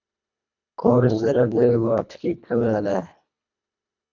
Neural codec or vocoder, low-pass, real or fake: codec, 24 kHz, 1.5 kbps, HILCodec; 7.2 kHz; fake